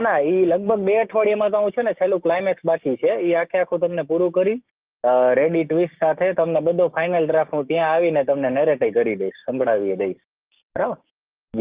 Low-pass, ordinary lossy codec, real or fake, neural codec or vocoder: 3.6 kHz; Opus, 32 kbps; fake; codec, 16 kHz, 6 kbps, DAC